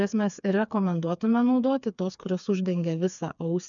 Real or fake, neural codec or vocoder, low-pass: fake; codec, 16 kHz, 4 kbps, FreqCodec, smaller model; 7.2 kHz